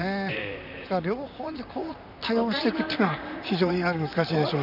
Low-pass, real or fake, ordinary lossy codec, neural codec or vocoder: 5.4 kHz; fake; none; vocoder, 44.1 kHz, 128 mel bands, Pupu-Vocoder